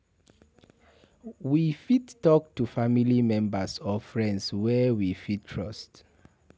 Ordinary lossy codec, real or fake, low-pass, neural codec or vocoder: none; real; none; none